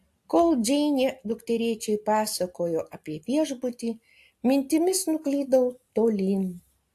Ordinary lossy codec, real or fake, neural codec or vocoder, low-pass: AAC, 64 kbps; real; none; 14.4 kHz